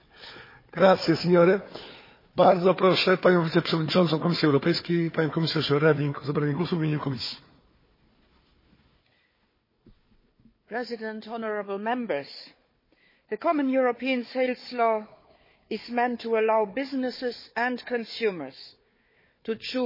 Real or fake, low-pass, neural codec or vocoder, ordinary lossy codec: fake; 5.4 kHz; codec, 16 kHz, 4 kbps, FunCodec, trained on Chinese and English, 50 frames a second; MP3, 24 kbps